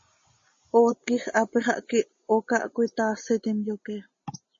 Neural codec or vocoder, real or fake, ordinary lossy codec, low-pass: none; real; MP3, 32 kbps; 7.2 kHz